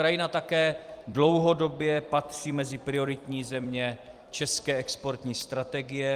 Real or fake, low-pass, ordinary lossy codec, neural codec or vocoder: fake; 14.4 kHz; Opus, 32 kbps; vocoder, 44.1 kHz, 128 mel bands every 256 samples, BigVGAN v2